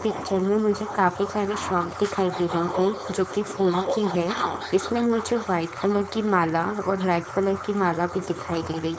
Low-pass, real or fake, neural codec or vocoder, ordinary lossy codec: none; fake; codec, 16 kHz, 4.8 kbps, FACodec; none